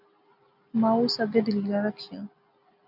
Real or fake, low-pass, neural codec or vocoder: real; 5.4 kHz; none